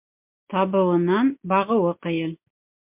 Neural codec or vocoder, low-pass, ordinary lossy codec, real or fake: none; 3.6 kHz; MP3, 32 kbps; real